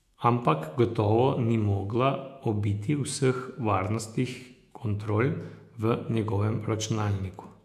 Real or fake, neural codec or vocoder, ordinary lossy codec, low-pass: fake; autoencoder, 48 kHz, 128 numbers a frame, DAC-VAE, trained on Japanese speech; AAC, 96 kbps; 14.4 kHz